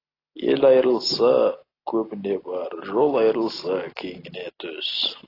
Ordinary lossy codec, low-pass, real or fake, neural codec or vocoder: AAC, 24 kbps; 5.4 kHz; real; none